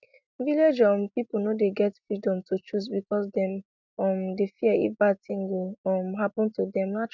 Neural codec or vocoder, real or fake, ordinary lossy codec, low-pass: none; real; none; 7.2 kHz